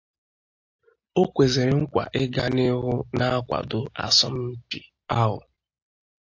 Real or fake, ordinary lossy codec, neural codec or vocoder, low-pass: real; AAC, 48 kbps; none; 7.2 kHz